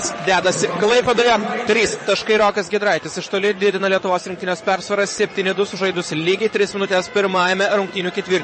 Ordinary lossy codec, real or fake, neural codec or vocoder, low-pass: MP3, 32 kbps; fake; vocoder, 22.05 kHz, 80 mel bands, WaveNeXt; 9.9 kHz